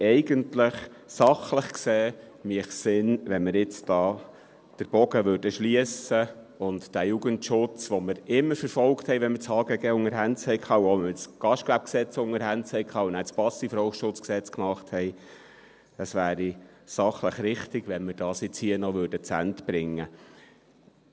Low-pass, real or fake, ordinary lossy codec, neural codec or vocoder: none; real; none; none